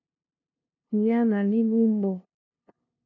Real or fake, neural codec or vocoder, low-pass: fake; codec, 16 kHz, 0.5 kbps, FunCodec, trained on LibriTTS, 25 frames a second; 7.2 kHz